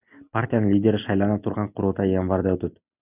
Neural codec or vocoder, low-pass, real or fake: none; 3.6 kHz; real